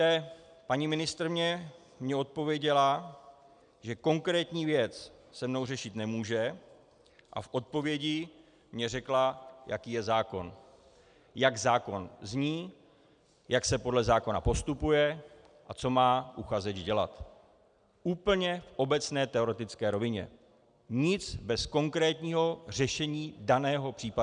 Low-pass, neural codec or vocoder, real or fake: 9.9 kHz; none; real